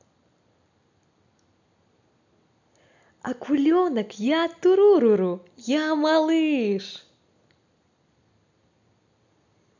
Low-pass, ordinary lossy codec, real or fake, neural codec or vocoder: 7.2 kHz; none; real; none